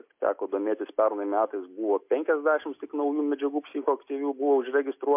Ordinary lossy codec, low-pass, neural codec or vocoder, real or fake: MP3, 32 kbps; 3.6 kHz; none; real